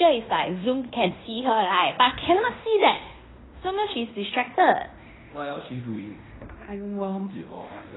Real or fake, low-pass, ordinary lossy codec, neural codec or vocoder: fake; 7.2 kHz; AAC, 16 kbps; codec, 16 kHz in and 24 kHz out, 0.9 kbps, LongCat-Audio-Codec, fine tuned four codebook decoder